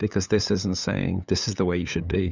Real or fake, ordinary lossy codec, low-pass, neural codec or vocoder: fake; Opus, 64 kbps; 7.2 kHz; codec, 16 kHz, 8 kbps, FunCodec, trained on LibriTTS, 25 frames a second